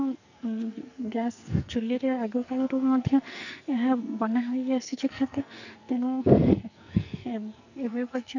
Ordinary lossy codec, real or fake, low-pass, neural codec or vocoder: none; fake; 7.2 kHz; codec, 44.1 kHz, 2.6 kbps, SNAC